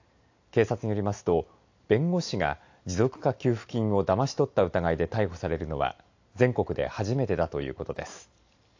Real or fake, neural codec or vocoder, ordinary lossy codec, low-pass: real; none; AAC, 48 kbps; 7.2 kHz